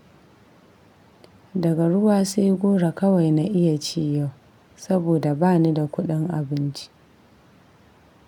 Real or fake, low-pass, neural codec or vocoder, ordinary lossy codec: real; 19.8 kHz; none; none